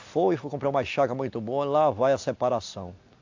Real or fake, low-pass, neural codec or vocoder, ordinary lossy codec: real; 7.2 kHz; none; AAC, 48 kbps